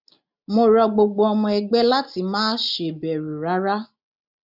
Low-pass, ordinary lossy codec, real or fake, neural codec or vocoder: 5.4 kHz; none; real; none